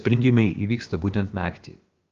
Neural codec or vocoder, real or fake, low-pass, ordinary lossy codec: codec, 16 kHz, about 1 kbps, DyCAST, with the encoder's durations; fake; 7.2 kHz; Opus, 32 kbps